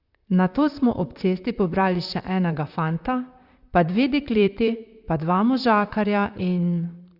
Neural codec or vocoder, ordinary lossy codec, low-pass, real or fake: vocoder, 44.1 kHz, 128 mel bands, Pupu-Vocoder; Opus, 64 kbps; 5.4 kHz; fake